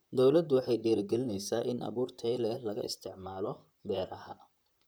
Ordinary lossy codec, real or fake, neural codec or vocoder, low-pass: none; fake; vocoder, 44.1 kHz, 128 mel bands, Pupu-Vocoder; none